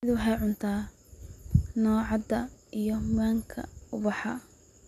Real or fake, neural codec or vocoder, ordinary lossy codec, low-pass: real; none; none; 14.4 kHz